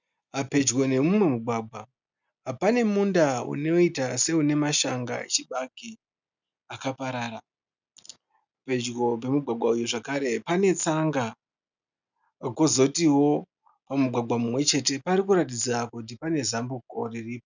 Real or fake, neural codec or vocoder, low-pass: real; none; 7.2 kHz